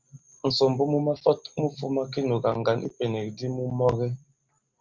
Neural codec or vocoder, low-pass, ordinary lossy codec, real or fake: none; 7.2 kHz; Opus, 32 kbps; real